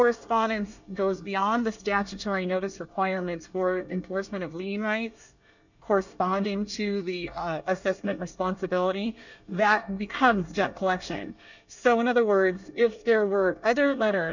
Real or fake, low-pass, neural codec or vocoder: fake; 7.2 kHz; codec, 24 kHz, 1 kbps, SNAC